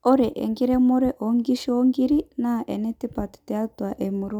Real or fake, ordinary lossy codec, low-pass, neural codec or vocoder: real; none; 19.8 kHz; none